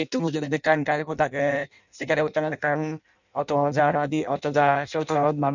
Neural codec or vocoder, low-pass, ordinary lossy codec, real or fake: codec, 16 kHz in and 24 kHz out, 0.6 kbps, FireRedTTS-2 codec; 7.2 kHz; none; fake